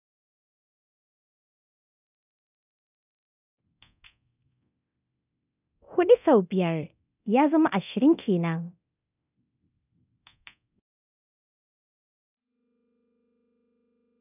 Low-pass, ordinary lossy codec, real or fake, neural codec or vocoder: 3.6 kHz; none; fake; codec, 24 kHz, 0.9 kbps, DualCodec